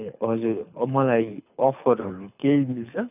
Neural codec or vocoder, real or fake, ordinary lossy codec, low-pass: codec, 24 kHz, 6 kbps, HILCodec; fake; none; 3.6 kHz